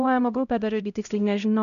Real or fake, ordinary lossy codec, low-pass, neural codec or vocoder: fake; MP3, 96 kbps; 7.2 kHz; codec, 16 kHz, 0.5 kbps, X-Codec, HuBERT features, trained on LibriSpeech